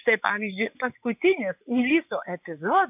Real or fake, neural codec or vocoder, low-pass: fake; vocoder, 24 kHz, 100 mel bands, Vocos; 3.6 kHz